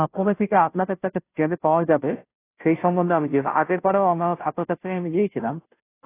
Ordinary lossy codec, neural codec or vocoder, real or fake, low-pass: AAC, 24 kbps; codec, 16 kHz, 0.5 kbps, FunCodec, trained on Chinese and English, 25 frames a second; fake; 3.6 kHz